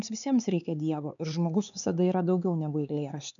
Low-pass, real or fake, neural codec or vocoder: 7.2 kHz; fake; codec, 16 kHz, 4 kbps, X-Codec, HuBERT features, trained on LibriSpeech